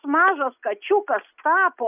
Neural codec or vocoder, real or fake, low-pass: none; real; 3.6 kHz